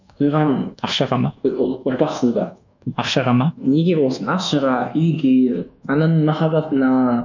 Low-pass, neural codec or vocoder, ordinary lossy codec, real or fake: 7.2 kHz; codec, 24 kHz, 1.2 kbps, DualCodec; none; fake